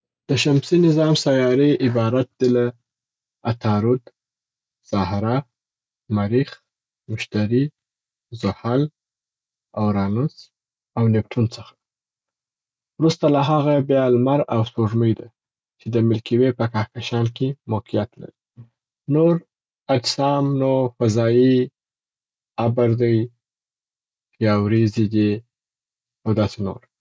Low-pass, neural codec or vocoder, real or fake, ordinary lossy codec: none; none; real; none